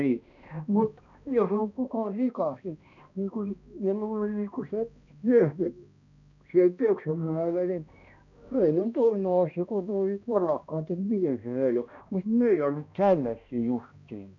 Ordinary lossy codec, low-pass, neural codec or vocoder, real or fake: none; 7.2 kHz; codec, 16 kHz, 1 kbps, X-Codec, HuBERT features, trained on balanced general audio; fake